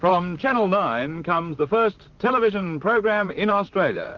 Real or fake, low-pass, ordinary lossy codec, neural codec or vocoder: fake; 7.2 kHz; Opus, 24 kbps; vocoder, 44.1 kHz, 128 mel bands, Pupu-Vocoder